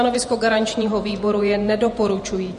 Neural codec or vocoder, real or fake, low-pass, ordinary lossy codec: vocoder, 48 kHz, 128 mel bands, Vocos; fake; 14.4 kHz; MP3, 48 kbps